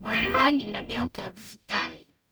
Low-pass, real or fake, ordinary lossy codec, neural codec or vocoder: none; fake; none; codec, 44.1 kHz, 0.9 kbps, DAC